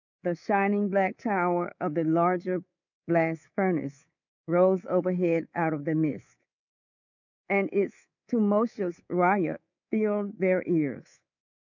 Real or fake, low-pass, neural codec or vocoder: fake; 7.2 kHz; codec, 24 kHz, 3.1 kbps, DualCodec